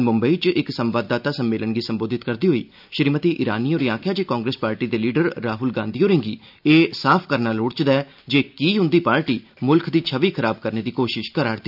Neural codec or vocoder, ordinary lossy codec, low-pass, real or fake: none; none; 5.4 kHz; real